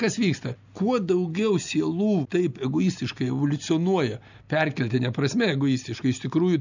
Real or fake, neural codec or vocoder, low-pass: real; none; 7.2 kHz